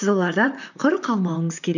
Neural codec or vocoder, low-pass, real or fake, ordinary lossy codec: vocoder, 44.1 kHz, 128 mel bands, Pupu-Vocoder; 7.2 kHz; fake; none